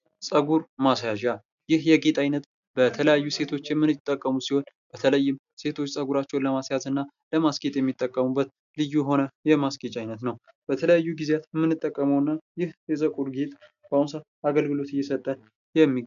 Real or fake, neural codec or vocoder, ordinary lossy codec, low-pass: real; none; MP3, 96 kbps; 7.2 kHz